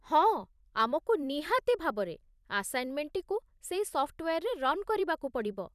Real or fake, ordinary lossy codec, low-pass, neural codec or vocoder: fake; none; 14.4 kHz; vocoder, 44.1 kHz, 128 mel bands every 256 samples, BigVGAN v2